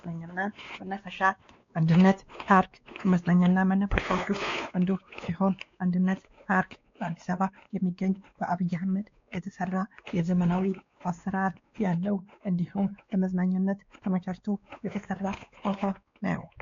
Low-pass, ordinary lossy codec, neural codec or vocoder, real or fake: 7.2 kHz; AAC, 48 kbps; codec, 16 kHz, 2 kbps, X-Codec, WavLM features, trained on Multilingual LibriSpeech; fake